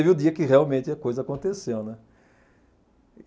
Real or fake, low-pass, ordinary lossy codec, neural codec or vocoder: real; none; none; none